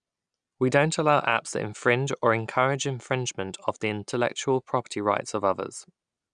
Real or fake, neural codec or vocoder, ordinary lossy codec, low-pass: real; none; none; 9.9 kHz